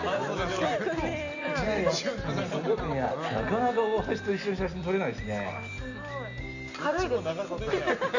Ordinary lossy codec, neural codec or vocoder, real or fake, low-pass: none; none; real; 7.2 kHz